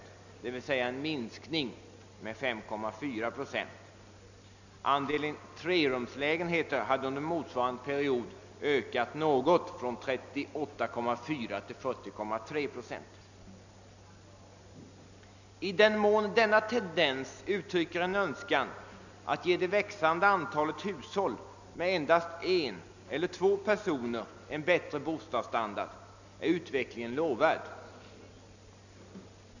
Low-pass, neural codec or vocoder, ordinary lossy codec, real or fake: 7.2 kHz; none; none; real